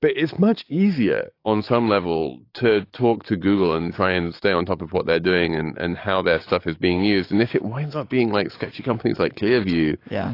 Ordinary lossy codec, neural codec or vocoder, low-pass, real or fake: AAC, 32 kbps; codec, 44.1 kHz, 7.8 kbps, DAC; 5.4 kHz; fake